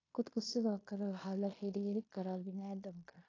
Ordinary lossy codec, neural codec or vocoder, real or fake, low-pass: AAC, 32 kbps; codec, 16 kHz in and 24 kHz out, 0.9 kbps, LongCat-Audio-Codec, fine tuned four codebook decoder; fake; 7.2 kHz